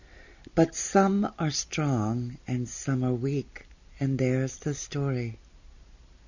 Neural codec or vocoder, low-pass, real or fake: none; 7.2 kHz; real